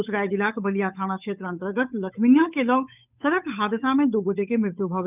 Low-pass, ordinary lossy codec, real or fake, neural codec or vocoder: 3.6 kHz; none; fake; codec, 16 kHz, 8 kbps, FunCodec, trained on Chinese and English, 25 frames a second